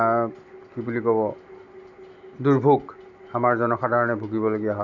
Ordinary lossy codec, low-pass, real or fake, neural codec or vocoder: none; 7.2 kHz; real; none